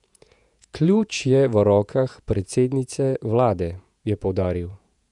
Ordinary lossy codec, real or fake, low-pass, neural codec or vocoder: none; fake; 10.8 kHz; vocoder, 48 kHz, 128 mel bands, Vocos